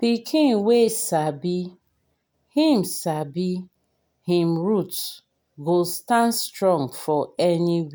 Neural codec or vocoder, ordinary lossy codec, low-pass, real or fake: none; none; none; real